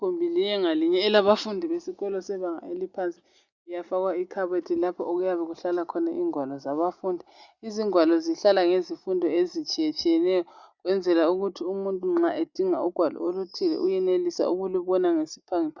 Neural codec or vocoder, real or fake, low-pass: none; real; 7.2 kHz